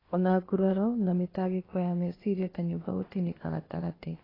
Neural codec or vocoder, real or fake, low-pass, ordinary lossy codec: codec, 16 kHz, 0.8 kbps, ZipCodec; fake; 5.4 kHz; AAC, 24 kbps